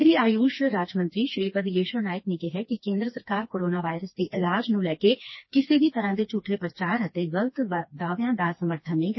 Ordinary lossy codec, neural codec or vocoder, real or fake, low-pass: MP3, 24 kbps; codec, 16 kHz, 2 kbps, FreqCodec, smaller model; fake; 7.2 kHz